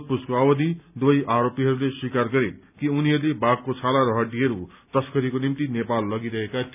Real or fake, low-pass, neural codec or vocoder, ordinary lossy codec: real; 3.6 kHz; none; none